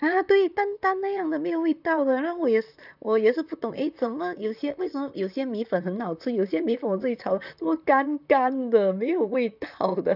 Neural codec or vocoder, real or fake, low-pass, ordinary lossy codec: vocoder, 44.1 kHz, 128 mel bands, Pupu-Vocoder; fake; 5.4 kHz; none